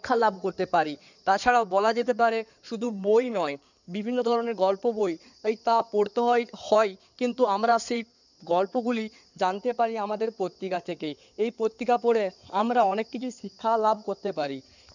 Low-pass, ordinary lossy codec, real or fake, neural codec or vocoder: 7.2 kHz; none; fake; codec, 16 kHz in and 24 kHz out, 2.2 kbps, FireRedTTS-2 codec